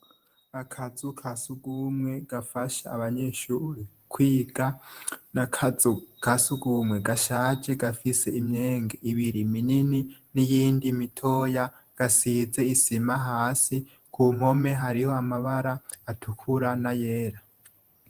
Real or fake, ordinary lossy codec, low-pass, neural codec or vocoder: fake; Opus, 24 kbps; 14.4 kHz; vocoder, 48 kHz, 128 mel bands, Vocos